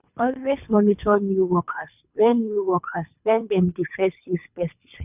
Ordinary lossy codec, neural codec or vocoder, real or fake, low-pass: none; codec, 24 kHz, 3 kbps, HILCodec; fake; 3.6 kHz